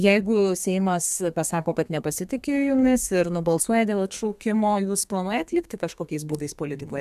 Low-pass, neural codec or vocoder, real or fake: 14.4 kHz; codec, 32 kHz, 1.9 kbps, SNAC; fake